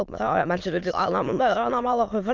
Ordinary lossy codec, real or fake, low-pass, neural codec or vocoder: Opus, 24 kbps; fake; 7.2 kHz; autoencoder, 22.05 kHz, a latent of 192 numbers a frame, VITS, trained on many speakers